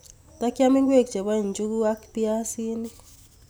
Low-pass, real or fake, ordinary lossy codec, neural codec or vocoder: none; real; none; none